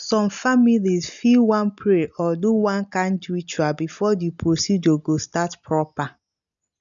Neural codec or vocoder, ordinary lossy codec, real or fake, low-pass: none; none; real; 7.2 kHz